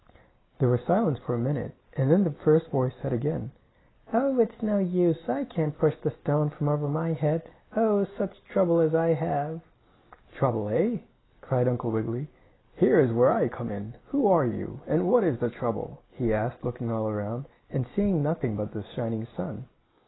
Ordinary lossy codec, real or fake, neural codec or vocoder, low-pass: AAC, 16 kbps; real; none; 7.2 kHz